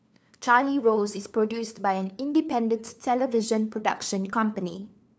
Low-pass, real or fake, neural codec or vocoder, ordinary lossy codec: none; fake; codec, 16 kHz, 2 kbps, FunCodec, trained on LibriTTS, 25 frames a second; none